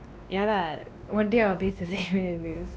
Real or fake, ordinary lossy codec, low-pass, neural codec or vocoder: fake; none; none; codec, 16 kHz, 2 kbps, X-Codec, WavLM features, trained on Multilingual LibriSpeech